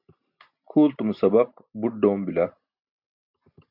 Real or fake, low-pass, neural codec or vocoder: real; 5.4 kHz; none